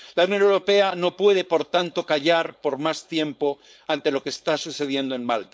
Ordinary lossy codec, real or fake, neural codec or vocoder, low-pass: none; fake; codec, 16 kHz, 4.8 kbps, FACodec; none